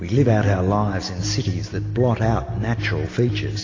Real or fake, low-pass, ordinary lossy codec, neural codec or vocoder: real; 7.2 kHz; AAC, 32 kbps; none